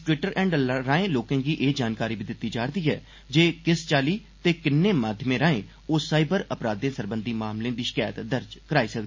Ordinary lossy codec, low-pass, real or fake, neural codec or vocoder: MP3, 32 kbps; 7.2 kHz; real; none